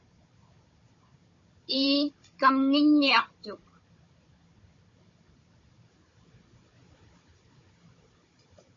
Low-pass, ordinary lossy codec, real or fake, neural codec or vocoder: 7.2 kHz; MP3, 32 kbps; fake; codec, 16 kHz, 16 kbps, FunCodec, trained on Chinese and English, 50 frames a second